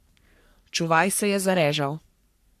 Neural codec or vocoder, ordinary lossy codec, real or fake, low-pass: codec, 44.1 kHz, 3.4 kbps, Pupu-Codec; AAC, 96 kbps; fake; 14.4 kHz